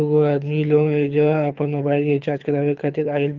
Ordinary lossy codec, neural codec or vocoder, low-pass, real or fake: Opus, 32 kbps; codec, 24 kHz, 6 kbps, HILCodec; 7.2 kHz; fake